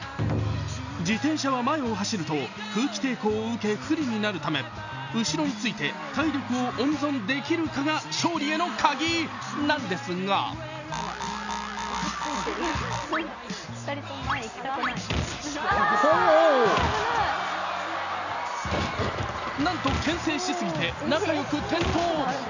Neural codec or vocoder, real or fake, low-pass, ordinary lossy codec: none; real; 7.2 kHz; none